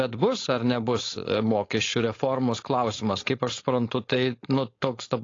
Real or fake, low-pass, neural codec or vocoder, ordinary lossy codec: fake; 7.2 kHz; codec, 16 kHz, 4.8 kbps, FACodec; AAC, 32 kbps